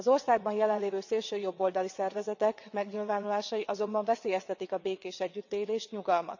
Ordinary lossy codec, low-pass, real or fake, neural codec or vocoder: none; 7.2 kHz; fake; vocoder, 22.05 kHz, 80 mel bands, WaveNeXt